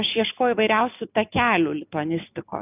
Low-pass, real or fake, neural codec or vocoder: 3.6 kHz; real; none